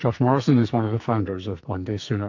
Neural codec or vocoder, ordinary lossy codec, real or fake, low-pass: codec, 44.1 kHz, 2.6 kbps, SNAC; AAC, 48 kbps; fake; 7.2 kHz